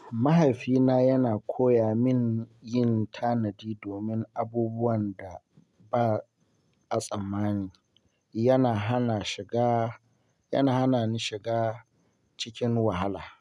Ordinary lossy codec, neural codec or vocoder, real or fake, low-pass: none; none; real; none